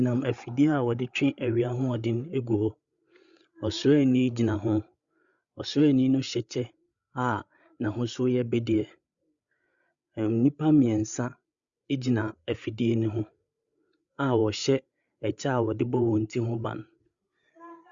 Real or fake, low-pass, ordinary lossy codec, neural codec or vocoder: fake; 7.2 kHz; Opus, 64 kbps; codec, 16 kHz, 8 kbps, FreqCodec, larger model